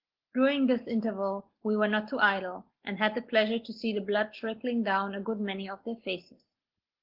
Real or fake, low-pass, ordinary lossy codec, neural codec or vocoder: real; 5.4 kHz; Opus, 16 kbps; none